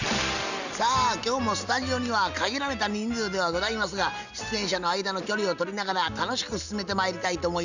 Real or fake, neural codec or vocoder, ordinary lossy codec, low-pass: real; none; none; 7.2 kHz